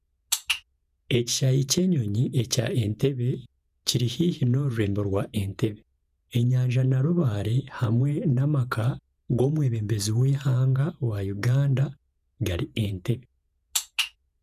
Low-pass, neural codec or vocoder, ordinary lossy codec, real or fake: 14.4 kHz; vocoder, 48 kHz, 128 mel bands, Vocos; none; fake